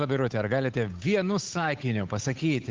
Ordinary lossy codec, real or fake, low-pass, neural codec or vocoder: Opus, 24 kbps; fake; 7.2 kHz; codec, 16 kHz, 8 kbps, FunCodec, trained on Chinese and English, 25 frames a second